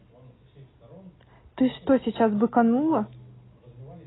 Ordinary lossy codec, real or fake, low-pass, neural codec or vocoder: AAC, 16 kbps; real; 7.2 kHz; none